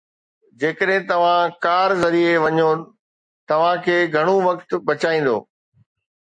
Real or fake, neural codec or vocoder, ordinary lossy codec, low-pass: real; none; MP3, 48 kbps; 9.9 kHz